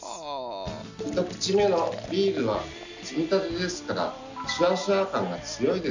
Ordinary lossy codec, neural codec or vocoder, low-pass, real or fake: none; none; 7.2 kHz; real